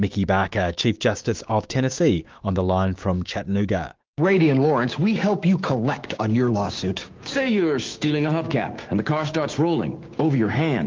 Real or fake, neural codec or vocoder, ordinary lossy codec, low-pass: fake; codec, 16 kHz, 6 kbps, DAC; Opus, 24 kbps; 7.2 kHz